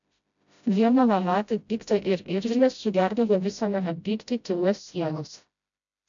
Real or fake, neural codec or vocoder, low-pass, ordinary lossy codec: fake; codec, 16 kHz, 0.5 kbps, FreqCodec, smaller model; 7.2 kHz; MP3, 64 kbps